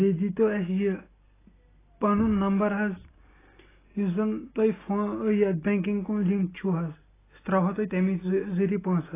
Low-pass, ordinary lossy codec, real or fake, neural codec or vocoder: 3.6 kHz; AAC, 16 kbps; real; none